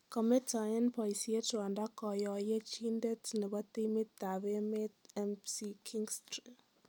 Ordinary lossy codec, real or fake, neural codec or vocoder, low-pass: none; real; none; 19.8 kHz